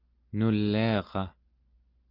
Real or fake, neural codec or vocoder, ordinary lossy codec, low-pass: real; none; Opus, 24 kbps; 5.4 kHz